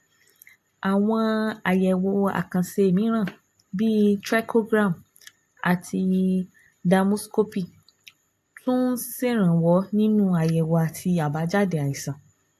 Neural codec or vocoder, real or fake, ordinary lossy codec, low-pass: none; real; AAC, 64 kbps; 14.4 kHz